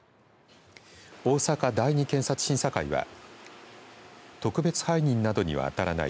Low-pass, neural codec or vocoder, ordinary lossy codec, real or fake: none; none; none; real